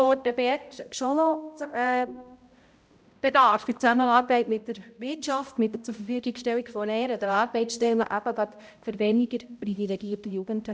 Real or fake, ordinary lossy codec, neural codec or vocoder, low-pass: fake; none; codec, 16 kHz, 0.5 kbps, X-Codec, HuBERT features, trained on balanced general audio; none